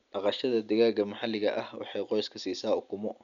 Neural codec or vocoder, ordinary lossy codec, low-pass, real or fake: none; none; 7.2 kHz; real